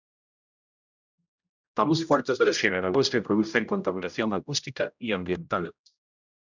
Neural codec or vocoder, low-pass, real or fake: codec, 16 kHz, 0.5 kbps, X-Codec, HuBERT features, trained on general audio; 7.2 kHz; fake